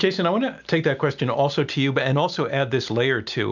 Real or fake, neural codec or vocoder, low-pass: real; none; 7.2 kHz